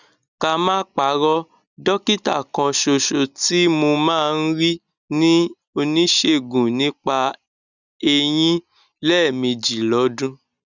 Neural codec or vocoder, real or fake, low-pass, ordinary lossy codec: none; real; 7.2 kHz; none